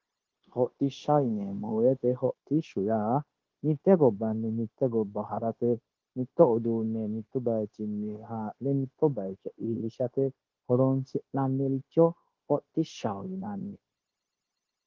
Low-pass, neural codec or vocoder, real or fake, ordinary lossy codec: 7.2 kHz; codec, 16 kHz, 0.9 kbps, LongCat-Audio-Codec; fake; Opus, 16 kbps